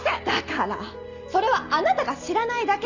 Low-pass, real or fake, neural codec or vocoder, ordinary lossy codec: 7.2 kHz; real; none; none